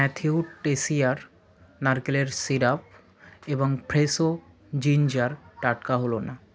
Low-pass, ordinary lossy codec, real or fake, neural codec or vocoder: none; none; real; none